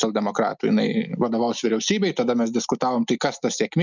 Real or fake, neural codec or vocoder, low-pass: real; none; 7.2 kHz